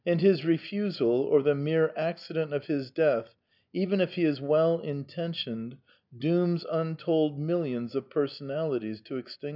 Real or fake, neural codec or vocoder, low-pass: real; none; 5.4 kHz